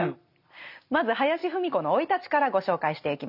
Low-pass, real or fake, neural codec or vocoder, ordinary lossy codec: 5.4 kHz; real; none; none